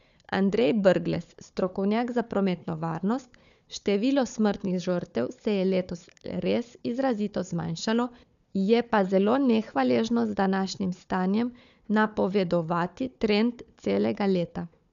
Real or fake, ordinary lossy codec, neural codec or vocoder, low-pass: fake; none; codec, 16 kHz, 4 kbps, FunCodec, trained on Chinese and English, 50 frames a second; 7.2 kHz